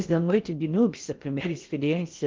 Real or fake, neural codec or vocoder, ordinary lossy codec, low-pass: fake; codec, 16 kHz in and 24 kHz out, 0.6 kbps, FocalCodec, streaming, 4096 codes; Opus, 32 kbps; 7.2 kHz